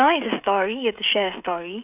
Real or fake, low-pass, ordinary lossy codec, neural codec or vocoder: fake; 3.6 kHz; none; codec, 16 kHz, 16 kbps, FreqCodec, smaller model